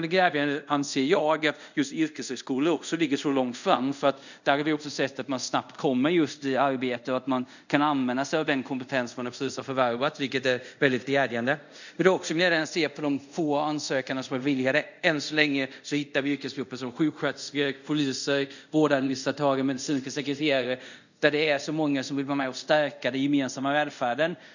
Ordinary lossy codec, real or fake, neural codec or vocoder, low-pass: none; fake; codec, 24 kHz, 0.5 kbps, DualCodec; 7.2 kHz